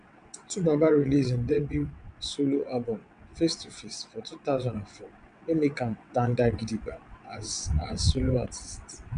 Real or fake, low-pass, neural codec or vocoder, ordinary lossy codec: fake; 9.9 kHz; vocoder, 22.05 kHz, 80 mel bands, WaveNeXt; MP3, 96 kbps